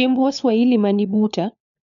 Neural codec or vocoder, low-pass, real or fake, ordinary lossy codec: codec, 16 kHz, 4 kbps, FunCodec, trained on LibriTTS, 50 frames a second; 7.2 kHz; fake; none